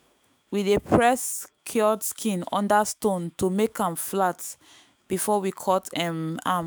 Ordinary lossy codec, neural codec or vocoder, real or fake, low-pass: none; autoencoder, 48 kHz, 128 numbers a frame, DAC-VAE, trained on Japanese speech; fake; none